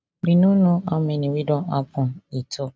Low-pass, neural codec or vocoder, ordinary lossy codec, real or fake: none; none; none; real